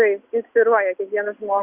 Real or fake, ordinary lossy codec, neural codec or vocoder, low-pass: real; Opus, 64 kbps; none; 3.6 kHz